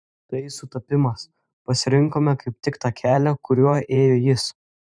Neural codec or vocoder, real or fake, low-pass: vocoder, 44.1 kHz, 128 mel bands every 512 samples, BigVGAN v2; fake; 9.9 kHz